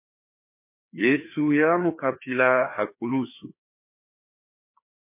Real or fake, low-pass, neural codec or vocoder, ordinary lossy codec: fake; 3.6 kHz; codec, 16 kHz, 2 kbps, FreqCodec, larger model; MP3, 24 kbps